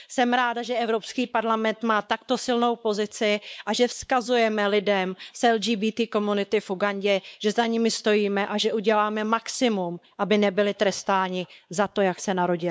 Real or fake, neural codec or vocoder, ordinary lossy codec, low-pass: fake; codec, 16 kHz, 4 kbps, X-Codec, WavLM features, trained on Multilingual LibriSpeech; none; none